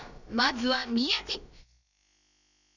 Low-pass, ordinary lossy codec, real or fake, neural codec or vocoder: 7.2 kHz; none; fake; codec, 16 kHz, about 1 kbps, DyCAST, with the encoder's durations